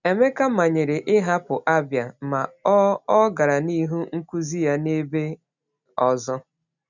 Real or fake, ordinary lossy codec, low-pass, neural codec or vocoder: real; none; 7.2 kHz; none